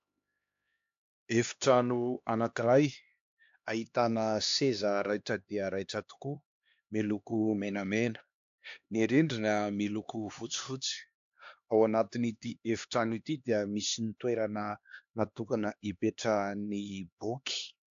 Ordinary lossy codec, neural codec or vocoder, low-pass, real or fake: AAC, 64 kbps; codec, 16 kHz, 2 kbps, X-Codec, HuBERT features, trained on LibriSpeech; 7.2 kHz; fake